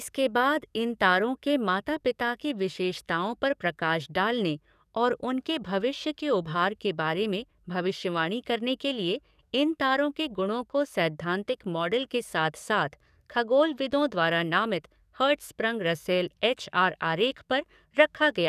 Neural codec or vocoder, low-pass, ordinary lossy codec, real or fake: codec, 44.1 kHz, 7.8 kbps, DAC; 14.4 kHz; none; fake